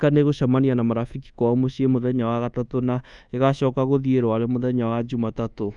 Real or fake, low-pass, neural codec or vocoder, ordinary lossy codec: fake; 10.8 kHz; codec, 24 kHz, 1.2 kbps, DualCodec; none